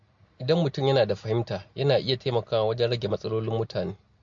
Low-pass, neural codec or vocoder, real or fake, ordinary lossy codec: 7.2 kHz; none; real; MP3, 48 kbps